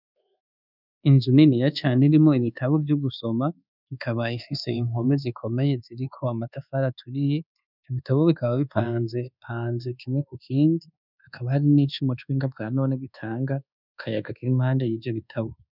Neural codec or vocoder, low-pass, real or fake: codec, 24 kHz, 1.2 kbps, DualCodec; 5.4 kHz; fake